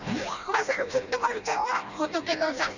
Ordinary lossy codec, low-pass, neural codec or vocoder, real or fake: none; 7.2 kHz; codec, 16 kHz, 1 kbps, FreqCodec, smaller model; fake